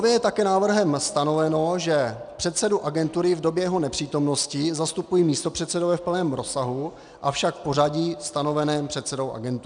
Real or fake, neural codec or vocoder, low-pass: real; none; 9.9 kHz